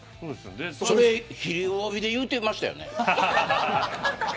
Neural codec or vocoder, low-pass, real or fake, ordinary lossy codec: none; none; real; none